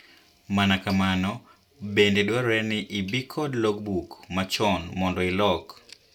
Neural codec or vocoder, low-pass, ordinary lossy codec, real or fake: none; 19.8 kHz; none; real